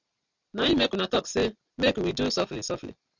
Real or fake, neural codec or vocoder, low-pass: real; none; 7.2 kHz